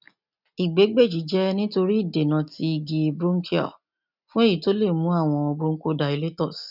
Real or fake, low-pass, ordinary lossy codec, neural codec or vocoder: real; 5.4 kHz; none; none